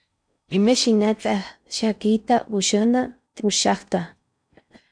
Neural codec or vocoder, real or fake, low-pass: codec, 16 kHz in and 24 kHz out, 0.6 kbps, FocalCodec, streaming, 4096 codes; fake; 9.9 kHz